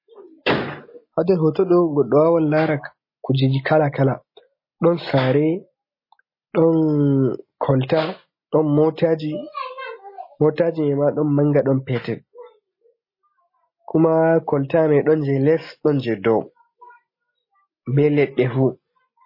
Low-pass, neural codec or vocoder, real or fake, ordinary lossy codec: 5.4 kHz; none; real; MP3, 24 kbps